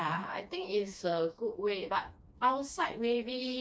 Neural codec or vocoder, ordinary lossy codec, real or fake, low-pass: codec, 16 kHz, 2 kbps, FreqCodec, smaller model; none; fake; none